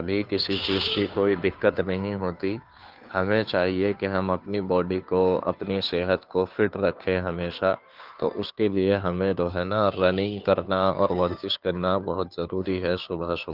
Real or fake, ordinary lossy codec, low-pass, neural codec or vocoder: fake; Opus, 24 kbps; 5.4 kHz; codec, 16 kHz, 2 kbps, FunCodec, trained on Chinese and English, 25 frames a second